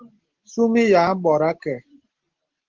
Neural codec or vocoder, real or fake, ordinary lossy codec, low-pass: none; real; Opus, 16 kbps; 7.2 kHz